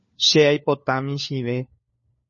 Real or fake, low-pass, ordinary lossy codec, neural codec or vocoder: fake; 7.2 kHz; MP3, 32 kbps; codec, 16 kHz, 4 kbps, FunCodec, trained on LibriTTS, 50 frames a second